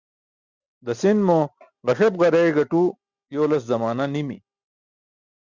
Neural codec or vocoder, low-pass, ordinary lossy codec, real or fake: none; 7.2 kHz; Opus, 64 kbps; real